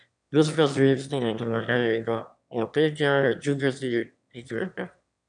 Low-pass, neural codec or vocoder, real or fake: 9.9 kHz; autoencoder, 22.05 kHz, a latent of 192 numbers a frame, VITS, trained on one speaker; fake